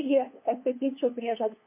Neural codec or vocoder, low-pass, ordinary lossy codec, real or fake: codec, 16 kHz, 2 kbps, FunCodec, trained on LibriTTS, 25 frames a second; 3.6 kHz; MP3, 24 kbps; fake